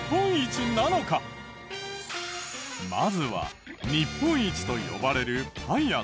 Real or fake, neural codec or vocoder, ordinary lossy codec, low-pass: real; none; none; none